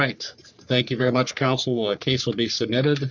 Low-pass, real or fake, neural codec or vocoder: 7.2 kHz; fake; codec, 44.1 kHz, 3.4 kbps, Pupu-Codec